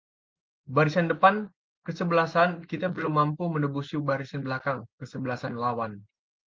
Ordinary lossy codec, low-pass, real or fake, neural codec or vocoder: Opus, 32 kbps; 7.2 kHz; real; none